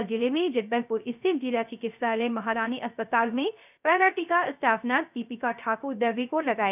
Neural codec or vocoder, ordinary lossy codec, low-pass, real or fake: codec, 16 kHz, 0.3 kbps, FocalCodec; none; 3.6 kHz; fake